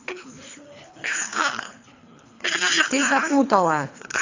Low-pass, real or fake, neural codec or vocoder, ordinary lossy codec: 7.2 kHz; fake; codec, 24 kHz, 3 kbps, HILCodec; AAC, 48 kbps